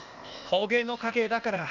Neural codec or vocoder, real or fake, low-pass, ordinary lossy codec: codec, 16 kHz, 0.8 kbps, ZipCodec; fake; 7.2 kHz; none